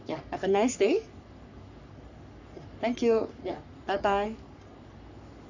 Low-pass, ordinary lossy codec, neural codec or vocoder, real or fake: 7.2 kHz; none; codec, 44.1 kHz, 3.4 kbps, Pupu-Codec; fake